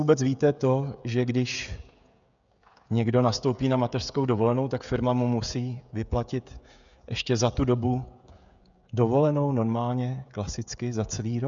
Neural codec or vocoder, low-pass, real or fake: codec, 16 kHz, 16 kbps, FreqCodec, smaller model; 7.2 kHz; fake